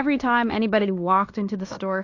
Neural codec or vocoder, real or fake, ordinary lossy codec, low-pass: codec, 16 kHz in and 24 kHz out, 0.9 kbps, LongCat-Audio-Codec, fine tuned four codebook decoder; fake; AAC, 48 kbps; 7.2 kHz